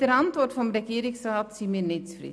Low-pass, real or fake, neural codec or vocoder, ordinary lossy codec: none; real; none; none